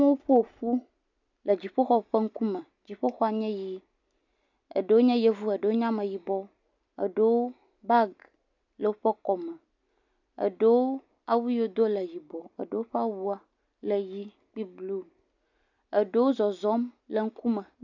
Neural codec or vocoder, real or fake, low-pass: none; real; 7.2 kHz